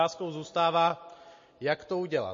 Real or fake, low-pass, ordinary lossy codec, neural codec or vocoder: real; 7.2 kHz; MP3, 32 kbps; none